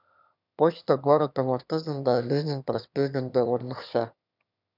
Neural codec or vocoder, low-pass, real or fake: autoencoder, 22.05 kHz, a latent of 192 numbers a frame, VITS, trained on one speaker; 5.4 kHz; fake